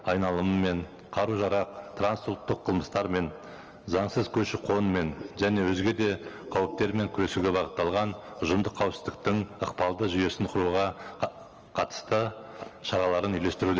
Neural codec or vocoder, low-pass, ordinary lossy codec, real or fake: none; 7.2 kHz; Opus, 24 kbps; real